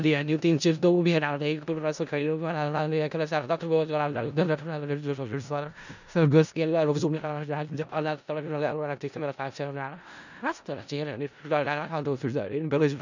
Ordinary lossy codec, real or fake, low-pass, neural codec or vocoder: none; fake; 7.2 kHz; codec, 16 kHz in and 24 kHz out, 0.4 kbps, LongCat-Audio-Codec, four codebook decoder